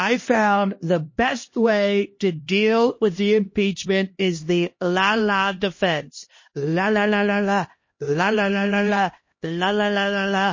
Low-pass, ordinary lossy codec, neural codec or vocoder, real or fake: 7.2 kHz; MP3, 32 kbps; codec, 16 kHz, 1 kbps, X-Codec, HuBERT features, trained on LibriSpeech; fake